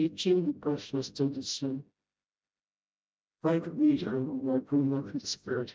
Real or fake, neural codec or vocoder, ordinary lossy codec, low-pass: fake; codec, 16 kHz, 0.5 kbps, FreqCodec, smaller model; none; none